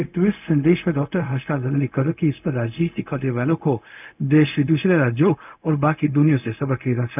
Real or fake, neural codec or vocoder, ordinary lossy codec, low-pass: fake; codec, 16 kHz, 0.4 kbps, LongCat-Audio-Codec; none; 3.6 kHz